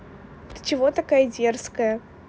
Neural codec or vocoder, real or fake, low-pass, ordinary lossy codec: none; real; none; none